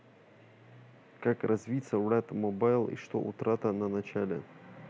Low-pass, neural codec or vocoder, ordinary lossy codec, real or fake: none; none; none; real